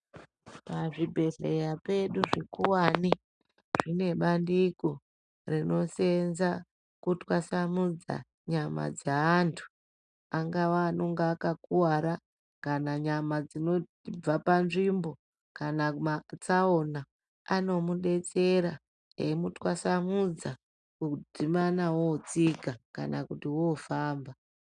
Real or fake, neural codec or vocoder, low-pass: real; none; 9.9 kHz